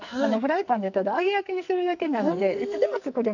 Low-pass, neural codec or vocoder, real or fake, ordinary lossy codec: 7.2 kHz; codec, 44.1 kHz, 2.6 kbps, SNAC; fake; none